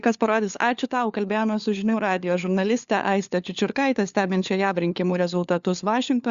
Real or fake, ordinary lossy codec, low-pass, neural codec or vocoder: fake; Opus, 64 kbps; 7.2 kHz; codec, 16 kHz, 4 kbps, FunCodec, trained on LibriTTS, 50 frames a second